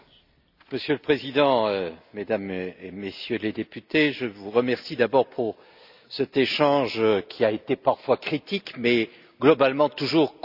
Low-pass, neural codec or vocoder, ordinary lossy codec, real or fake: 5.4 kHz; none; none; real